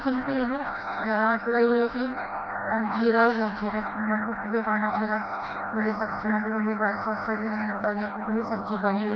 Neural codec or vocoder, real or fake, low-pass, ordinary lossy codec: codec, 16 kHz, 1 kbps, FreqCodec, smaller model; fake; none; none